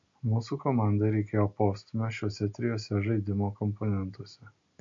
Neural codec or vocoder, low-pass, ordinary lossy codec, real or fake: none; 7.2 kHz; MP3, 48 kbps; real